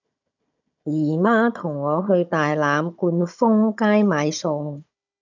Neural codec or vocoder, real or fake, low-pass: codec, 16 kHz, 16 kbps, FunCodec, trained on Chinese and English, 50 frames a second; fake; 7.2 kHz